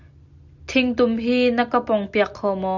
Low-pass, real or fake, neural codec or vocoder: 7.2 kHz; real; none